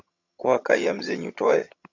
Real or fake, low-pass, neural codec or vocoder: fake; 7.2 kHz; vocoder, 22.05 kHz, 80 mel bands, HiFi-GAN